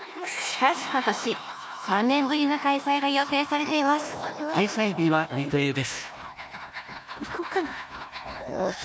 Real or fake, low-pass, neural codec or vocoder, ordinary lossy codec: fake; none; codec, 16 kHz, 1 kbps, FunCodec, trained on Chinese and English, 50 frames a second; none